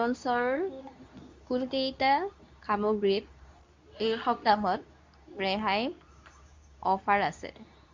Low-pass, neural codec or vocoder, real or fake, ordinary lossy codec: 7.2 kHz; codec, 24 kHz, 0.9 kbps, WavTokenizer, medium speech release version 2; fake; MP3, 64 kbps